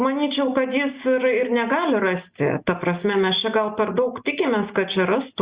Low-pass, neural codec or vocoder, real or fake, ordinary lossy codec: 3.6 kHz; none; real; Opus, 64 kbps